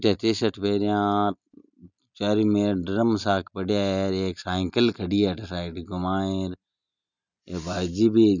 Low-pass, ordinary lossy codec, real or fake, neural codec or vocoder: 7.2 kHz; none; real; none